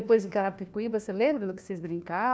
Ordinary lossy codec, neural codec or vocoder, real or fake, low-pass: none; codec, 16 kHz, 1 kbps, FunCodec, trained on LibriTTS, 50 frames a second; fake; none